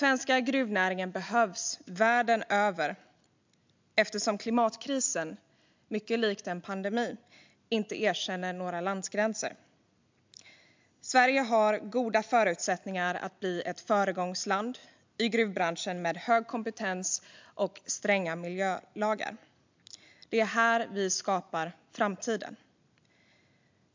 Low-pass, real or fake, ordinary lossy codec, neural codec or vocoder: 7.2 kHz; real; MP3, 64 kbps; none